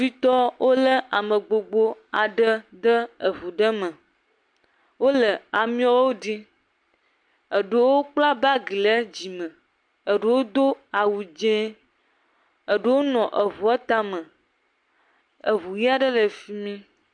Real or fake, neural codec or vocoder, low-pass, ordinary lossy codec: real; none; 9.9 kHz; MP3, 64 kbps